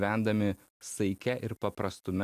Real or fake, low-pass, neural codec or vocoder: real; 14.4 kHz; none